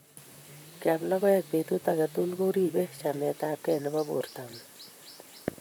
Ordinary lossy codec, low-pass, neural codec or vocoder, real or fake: none; none; vocoder, 44.1 kHz, 128 mel bands, Pupu-Vocoder; fake